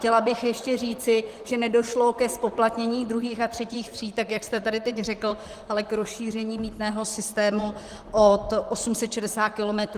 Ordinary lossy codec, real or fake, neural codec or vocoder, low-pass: Opus, 24 kbps; fake; vocoder, 44.1 kHz, 128 mel bands, Pupu-Vocoder; 14.4 kHz